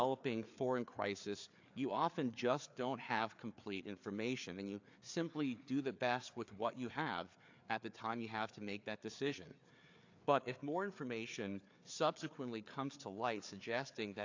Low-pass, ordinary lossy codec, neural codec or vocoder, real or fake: 7.2 kHz; AAC, 48 kbps; codec, 16 kHz, 4 kbps, FreqCodec, larger model; fake